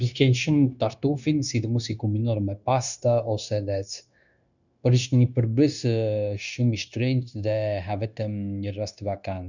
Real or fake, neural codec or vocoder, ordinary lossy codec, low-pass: fake; codec, 16 kHz, 0.9 kbps, LongCat-Audio-Codec; none; 7.2 kHz